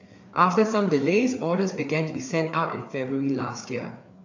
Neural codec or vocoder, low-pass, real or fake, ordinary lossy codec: codec, 16 kHz, 4 kbps, FreqCodec, larger model; 7.2 kHz; fake; AAC, 48 kbps